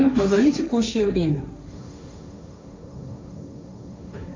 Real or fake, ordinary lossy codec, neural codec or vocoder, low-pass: fake; MP3, 64 kbps; codec, 16 kHz, 1.1 kbps, Voila-Tokenizer; 7.2 kHz